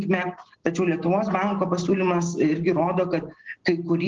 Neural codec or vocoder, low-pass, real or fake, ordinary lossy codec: none; 7.2 kHz; real; Opus, 16 kbps